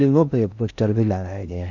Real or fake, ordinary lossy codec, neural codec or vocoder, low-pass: fake; none; codec, 16 kHz in and 24 kHz out, 0.6 kbps, FocalCodec, streaming, 4096 codes; 7.2 kHz